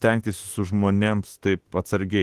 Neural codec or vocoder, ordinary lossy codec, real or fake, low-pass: autoencoder, 48 kHz, 32 numbers a frame, DAC-VAE, trained on Japanese speech; Opus, 16 kbps; fake; 14.4 kHz